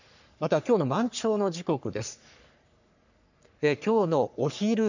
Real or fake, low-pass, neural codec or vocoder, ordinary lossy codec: fake; 7.2 kHz; codec, 44.1 kHz, 3.4 kbps, Pupu-Codec; none